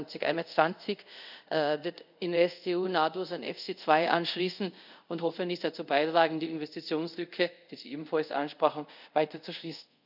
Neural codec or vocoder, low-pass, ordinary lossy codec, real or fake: codec, 24 kHz, 0.5 kbps, DualCodec; 5.4 kHz; none; fake